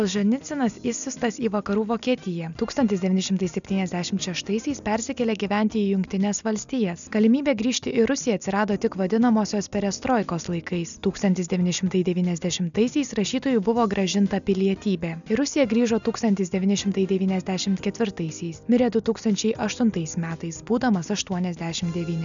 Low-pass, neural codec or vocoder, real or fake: 7.2 kHz; none; real